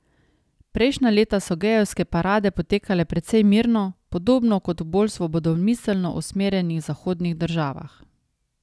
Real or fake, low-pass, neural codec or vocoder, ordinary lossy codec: real; none; none; none